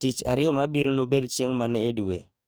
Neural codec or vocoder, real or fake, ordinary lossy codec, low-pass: codec, 44.1 kHz, 2.6 kbps, DAC; fake; none; none